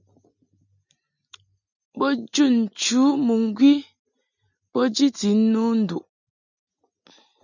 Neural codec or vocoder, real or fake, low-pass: none; real; 7.2 kHz